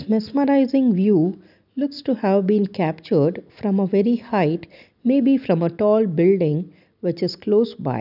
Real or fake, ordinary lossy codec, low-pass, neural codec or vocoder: real; none; 5.4 kHz; none